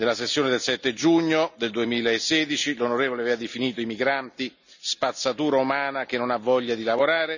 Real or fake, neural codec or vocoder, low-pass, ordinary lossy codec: real; none; 7.2 kHz; none